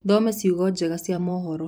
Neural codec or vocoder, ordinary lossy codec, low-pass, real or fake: vocoder, 44.1 kHz, 128 mel bands every 256 samples, BigVGAN v2; none; none; fake